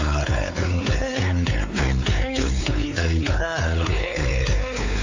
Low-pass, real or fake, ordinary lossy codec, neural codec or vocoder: 7.2 kHz; fake; AAC, 48 kbps; codec, 16 kHz, 4 kbps, X-Codec, WavLM features, trained on Multilingual LibriSpeech